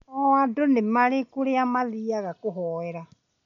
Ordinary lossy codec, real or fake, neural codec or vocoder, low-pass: MP3, 64 kbps; real; none; 7.2 kHz